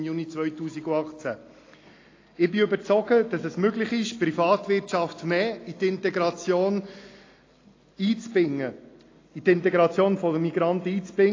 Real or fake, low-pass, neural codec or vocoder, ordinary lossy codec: real; 7.2 kHz; none; AAC, 32 kbps